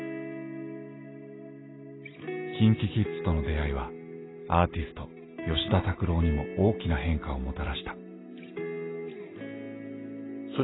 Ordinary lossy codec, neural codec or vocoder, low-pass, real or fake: AAC, 16 kbps; none; 7.2 kHz; real